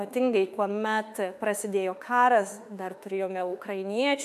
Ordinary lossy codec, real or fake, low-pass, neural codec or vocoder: AAC, 96 kbps; fake; 14.4 kHz; autoencoder, 48 kHz, 32 numbers a frame, DAC-VAE, trained on Japanese speech